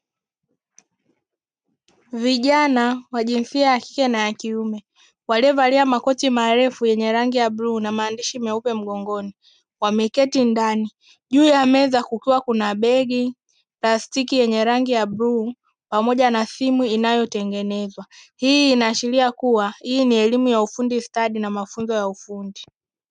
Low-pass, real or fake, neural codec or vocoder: 9.9 kHz; real; none